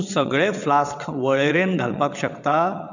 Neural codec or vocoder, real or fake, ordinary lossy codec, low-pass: vocoder, 22.05 kHz, 80 mel bands, WaveNeXt; fake; none; 7.2 kHz